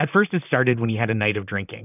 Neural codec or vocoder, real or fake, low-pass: vocoder, 44.1 kHz, 128 mel bands, Pupu-Vocoder; fake; 3.6 kHz